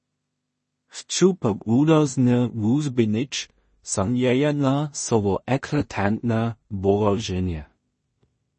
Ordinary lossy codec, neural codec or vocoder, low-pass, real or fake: MP3, 32 kbps; codec, 16 kHz in and 24 kHz out, 0.4 kbps, LongCat-Audio-Codec, two codebook decoder; 10.8 kHz; fake